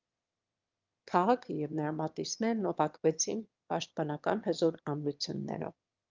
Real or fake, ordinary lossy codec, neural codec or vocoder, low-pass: fake; Opus, 24 kbps; autoencoder, 22.05 kHz, a latent of 192 numbers a frame, VITS, trained on one speaker; 7.2 kHz